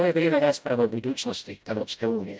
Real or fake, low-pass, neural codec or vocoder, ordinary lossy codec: fake; none; codec, 16 kHz, 0.5 kbps, FreqCodec, smaller model; none